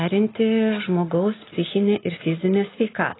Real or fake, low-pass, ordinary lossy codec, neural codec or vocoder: real; 7.2 kHz; AAC, 16 kbps; none